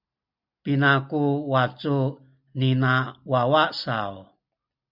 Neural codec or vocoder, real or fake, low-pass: none; real; 5.4 kHz